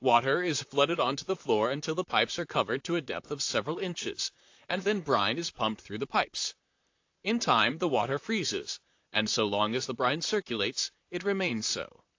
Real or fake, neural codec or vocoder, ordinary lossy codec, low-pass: fake; vocoder, 44.1 kHz, 128 mel bands, Pupu-Vocoder; AAC, 48 kbps; 7.2 kHz